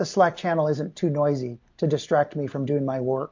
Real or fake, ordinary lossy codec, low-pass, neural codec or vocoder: real; MP3, 48 kbps; 7.2 kHz; none